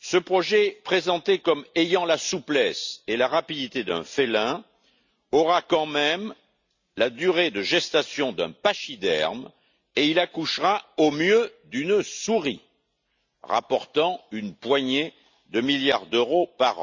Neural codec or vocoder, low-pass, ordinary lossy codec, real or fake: none; 7.2 kHz; Opus, 64 kbps; real